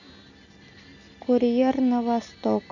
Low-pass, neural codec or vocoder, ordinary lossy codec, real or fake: 7.2 kHz; none; none; real